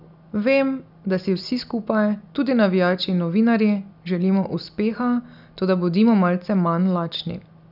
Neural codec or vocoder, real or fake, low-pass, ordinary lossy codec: none; real; 5.4 kHz; none